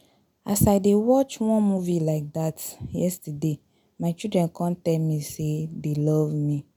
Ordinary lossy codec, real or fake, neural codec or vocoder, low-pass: none; real; none; 19.8 kHz